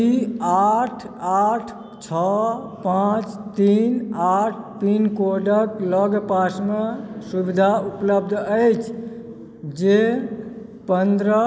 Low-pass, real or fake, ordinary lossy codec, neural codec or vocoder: none; real; none; none